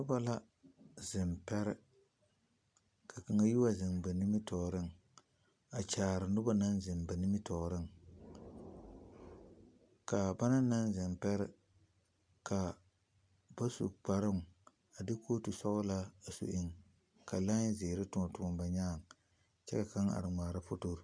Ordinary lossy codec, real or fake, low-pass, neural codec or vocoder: MP3, 96 kbps; real; 9.9 kHz; none